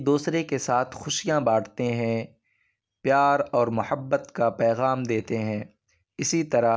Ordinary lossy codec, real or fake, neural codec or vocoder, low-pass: none; real; none; none